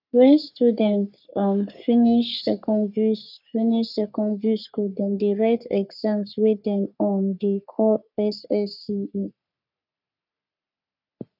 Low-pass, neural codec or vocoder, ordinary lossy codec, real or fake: 5.4 kHz; codec, 44.1 kHz, 3.4 kbps, Pupu-Codec; none; fake